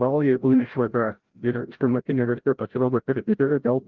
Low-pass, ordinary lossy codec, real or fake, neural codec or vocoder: 7.2 kHz; Opus, 16 kbps; fake; codec, 16 kHz, 0.5 kbps, FreqCodec, larger model